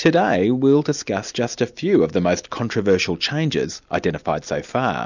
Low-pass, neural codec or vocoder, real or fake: 7.2 kHz; none; real